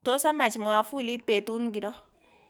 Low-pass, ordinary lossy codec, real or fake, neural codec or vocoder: none; none; fake; codec, 44.1 kHz, 2.6 kbps, SNAC